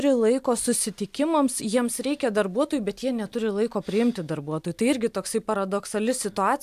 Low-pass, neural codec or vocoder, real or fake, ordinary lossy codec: 14.4 kHz; none; real; AAC, 96 kbps